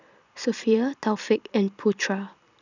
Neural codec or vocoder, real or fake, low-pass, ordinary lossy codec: vocoder, 44.1 kHz, 128 mel bands every 256 samples, BigVGAN v2; fake; 7.2 kHz; none